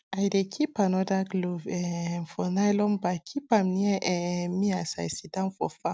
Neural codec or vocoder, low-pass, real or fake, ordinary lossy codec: none; none; real; none